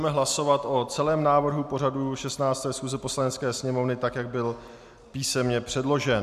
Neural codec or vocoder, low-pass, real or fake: none; 14.4 kHz; real